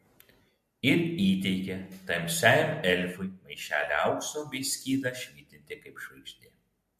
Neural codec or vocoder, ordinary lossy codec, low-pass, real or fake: none; MP3, 64 kbps; 14.4 kHz; real